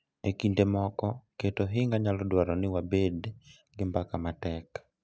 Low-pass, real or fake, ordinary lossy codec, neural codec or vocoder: none; real; none; none